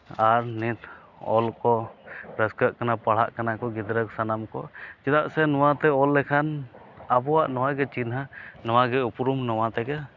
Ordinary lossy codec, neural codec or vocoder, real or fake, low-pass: none; none; real; 7.2 kHz